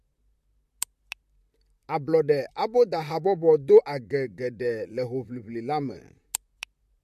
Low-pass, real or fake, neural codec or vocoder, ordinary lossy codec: 14.4 kHz; fake; vocoder, 44.1 kHz, 128 mel bands, Pupu-Vocoder; MP3, 64 kbps